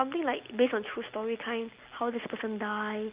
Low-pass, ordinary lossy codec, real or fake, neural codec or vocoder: 3.6 kHz; Opus, 32 kbps; real; none